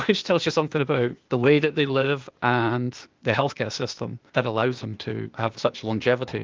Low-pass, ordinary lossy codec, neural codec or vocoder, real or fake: 7.2 kHz; Opus, 24 kbps; codec, 16 kHz, 0.8 kbps, ZipCodec; fake